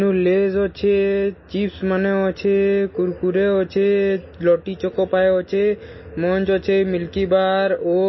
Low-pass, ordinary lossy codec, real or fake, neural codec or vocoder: 7.2 kHz; MP3, 24 kbps; real; none